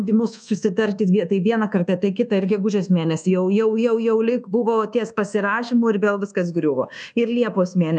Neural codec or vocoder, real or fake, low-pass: codec, 24 kHz, 1.2 kbps, DualCodec; fake; 10.8 kHz